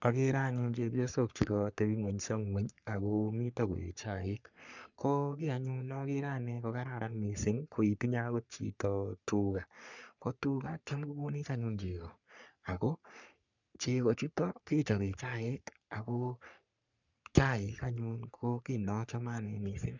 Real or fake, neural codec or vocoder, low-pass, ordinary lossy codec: fake; codec, 44.1 kHz, 3.4 kbps, Pupu-Codec; 7.2 kHz; none